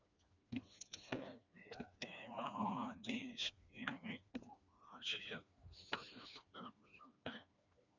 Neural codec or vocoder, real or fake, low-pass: codec, 16 kHz, 2 kbps, FreqCodec, smaller model; fake; 7.2 kHz